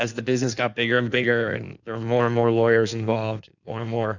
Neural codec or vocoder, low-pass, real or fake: codec, 16 kHz in and 24 kHz out, 1.1 kbps, FireRedTTS-2 codec; 7.2 kHz; fake